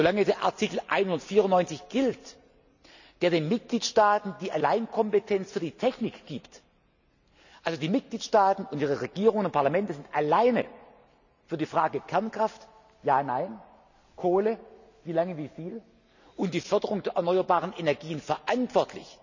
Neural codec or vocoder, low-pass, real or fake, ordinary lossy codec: none; 7.2 kHz; real; none